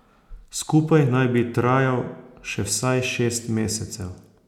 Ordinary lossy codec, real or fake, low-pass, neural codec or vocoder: none; real; 19.8 kHz; none